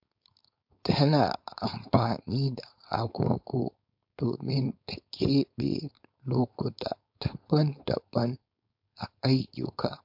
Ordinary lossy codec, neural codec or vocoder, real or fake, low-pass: MP3, 48 kbps; codec, 16 kHz, 4.8 kbps, FACodec; fake; 5.4 kHz